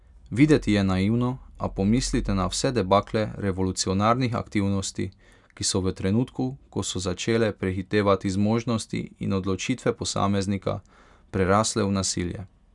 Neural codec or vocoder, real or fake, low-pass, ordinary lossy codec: none; real; 10.8 kHz; none